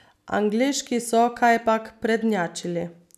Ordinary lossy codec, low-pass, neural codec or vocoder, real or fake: none; 14.4 kHz; none; real